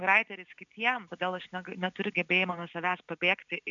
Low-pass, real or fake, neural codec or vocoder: 7.2 kHz; real; none